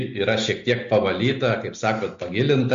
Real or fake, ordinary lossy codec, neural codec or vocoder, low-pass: real; MP3, 48 kbps; none; 7.2 kHz